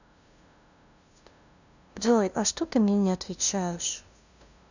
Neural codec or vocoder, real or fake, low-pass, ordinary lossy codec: codec, 16 kHz, 0.5 kbps, FunCodec, trained on LibriTTS, 25 frames a second; fake; 7.2 kHz; none